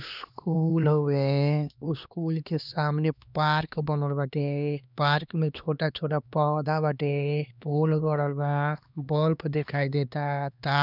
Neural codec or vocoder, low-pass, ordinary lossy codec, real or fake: codec, 16 kHz, 2 kbps, X-Codec, HuBERT features, trained on LibriSpeech; 5.4 kHz; none; fake